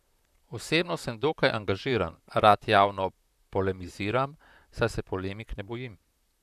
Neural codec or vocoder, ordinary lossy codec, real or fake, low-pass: vocoder, 44.1 kHz, 128 mel bands, Pupu-Vocoder; none; fake; 14.4 kHz